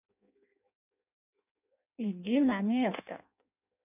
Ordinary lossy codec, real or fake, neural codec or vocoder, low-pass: none; fake; codec, 16 kHz in and 24 kHz out, 0.6 kbps, FireRedTTS-2 codec; 3.6 kHz